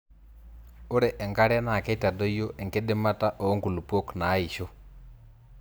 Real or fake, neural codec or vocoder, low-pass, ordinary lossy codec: real; none; none; none